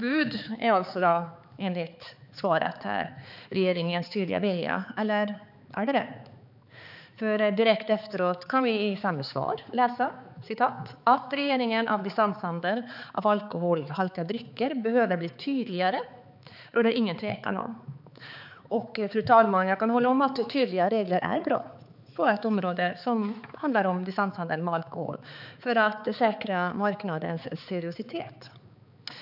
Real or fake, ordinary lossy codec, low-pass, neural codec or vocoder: fake; none; 5.4 kHz; codec, 16 kHz, 4 kbps, X-Codec, HuBERT features, trained on balanced general audio